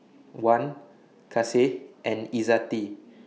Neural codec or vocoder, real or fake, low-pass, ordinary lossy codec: none; real; none; none